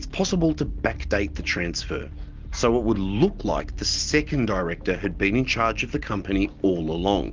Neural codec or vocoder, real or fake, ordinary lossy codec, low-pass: none; real; Opus, 24 kbps; 7.2 kHz